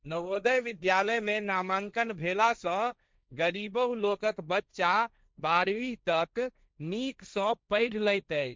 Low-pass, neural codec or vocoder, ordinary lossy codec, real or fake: none; codec, 16 kHz, 1.1 kbps, Voila-Tokenizer; none; fake